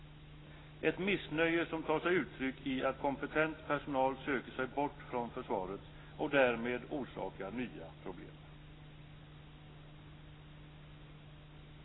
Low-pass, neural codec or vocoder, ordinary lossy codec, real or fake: 7.2 kHz; none; AAC, 16 kbps; real